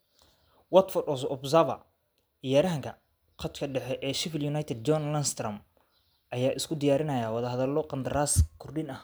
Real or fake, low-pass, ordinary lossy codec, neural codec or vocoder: real; none; none; none